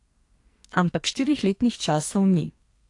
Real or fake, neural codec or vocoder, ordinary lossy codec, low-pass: fake; codec, 44.1 kHz, 2.6 kbps, SNAC; AAC, 48 kbps; 10.8 kHz